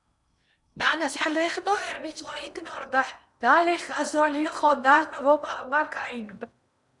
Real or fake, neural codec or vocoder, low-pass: fake; codec, 16 kHz in and 24 kHz out, 0.8 kbps, FocalCodec, streaming, 65536 codes; 10.8 kHz